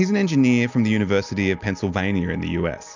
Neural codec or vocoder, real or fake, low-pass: none; real; 7.2 kHz